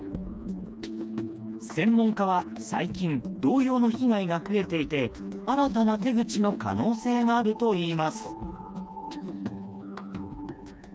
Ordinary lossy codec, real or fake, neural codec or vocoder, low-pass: none; fake; codec, 16 kHz, 2 kbps, FreqCodec, smaller model; none